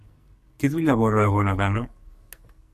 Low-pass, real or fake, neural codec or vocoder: 14.4 kHz; fake; codec, 44.1 kHz, 2.6 kbps, SNAC